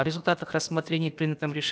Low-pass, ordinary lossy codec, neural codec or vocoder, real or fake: none; none; codec, 16 kHz, about 1 kbps, DyCAST, with the encoder's durations; fake